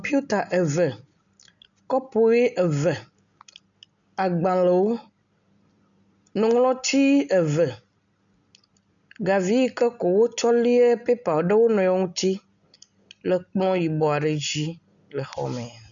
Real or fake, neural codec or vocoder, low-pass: real; none; 7.2 kHz